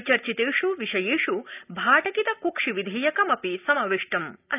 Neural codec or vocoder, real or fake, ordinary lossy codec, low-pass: none; real; none; 3.6 kHz